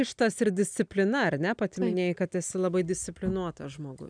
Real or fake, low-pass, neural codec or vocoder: real; 9.9 kHz; none